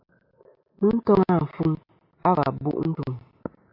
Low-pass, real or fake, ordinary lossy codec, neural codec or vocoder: 5.4 kHz; real; MP3, 48 kbps; none